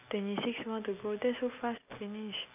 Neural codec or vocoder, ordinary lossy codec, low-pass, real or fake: none; none; 3.6 kHz; real